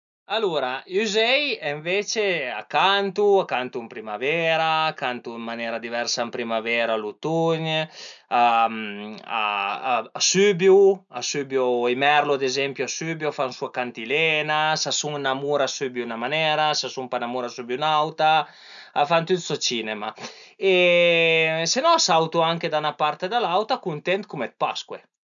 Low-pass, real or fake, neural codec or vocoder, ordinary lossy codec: 7.2 kHz; real; none; none